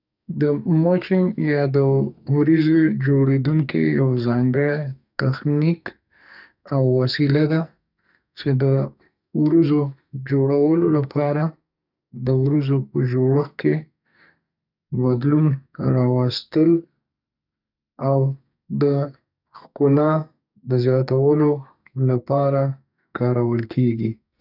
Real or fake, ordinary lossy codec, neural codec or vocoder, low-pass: fake; none; codec, 44.1 kHz, 2.6 kbps, SNAC; 5.4 kHz